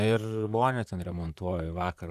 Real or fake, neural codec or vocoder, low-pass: fake; vocoder, 44.1 kHz, 128 mel bands, Pupu-Vocoder; 14.4 kHz